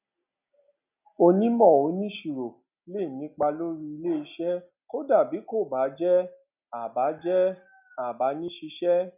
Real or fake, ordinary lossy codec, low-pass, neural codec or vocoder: real; none; 3.6 kHz; none